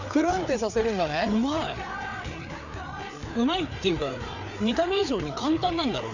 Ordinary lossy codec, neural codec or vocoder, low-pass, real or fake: none; codec, 16 kHz, 4 kbps, FreqCodec, larger model; 7.2 kHz; fake